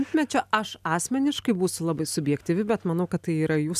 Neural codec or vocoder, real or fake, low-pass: none; real; 14.4 kHz